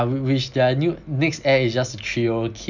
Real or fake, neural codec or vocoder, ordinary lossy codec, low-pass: real; none; none; 7.2 kHz